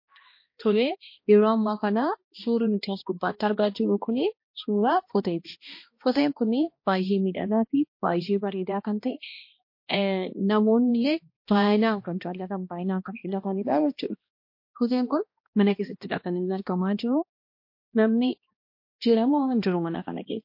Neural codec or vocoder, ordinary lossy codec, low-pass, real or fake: codec, 16 kHz, 1 kbps, X-Codec, HuBERT features, trained on balanced general audio; MP3, 32 kbps; 5.4 kHz; fake